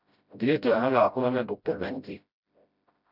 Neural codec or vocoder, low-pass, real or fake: codec, 16 kHz, 0.5 kbps, FreqCodec, smaller model; 5.4 kHz; fake